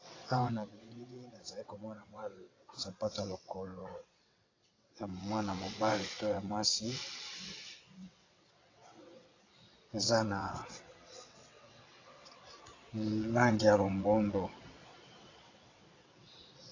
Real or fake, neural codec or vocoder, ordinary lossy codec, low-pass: fake; vocoder, 22.05 kHz, 80 mel bands, WaveNeXt; AAC, 32 kbps; 7.2 kHz